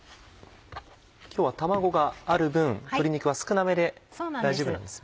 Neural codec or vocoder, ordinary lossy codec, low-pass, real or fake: none; none; none; real